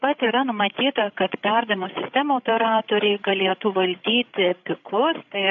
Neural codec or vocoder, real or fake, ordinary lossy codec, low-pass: codec, 16 kHz, 16 kbps, FreqCodec, larger model; fake; AAC, 48 kbps; 7.2 kHz